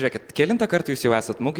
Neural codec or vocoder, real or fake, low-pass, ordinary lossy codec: vocoder, 44.1 kHz, 128 mel bands every 256 samples, BigVGAN v2; fake; 19.8 kHz; Opus, 24 kbps